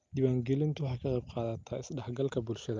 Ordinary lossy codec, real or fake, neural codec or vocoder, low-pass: Opus, 24 kbps; real; none; 7.2 kHz